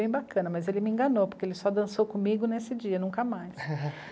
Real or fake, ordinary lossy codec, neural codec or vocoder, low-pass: real; none; none; none